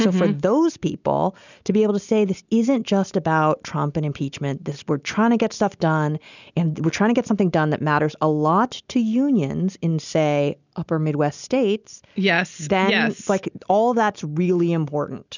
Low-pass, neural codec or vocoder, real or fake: 7.2 kHz; none; real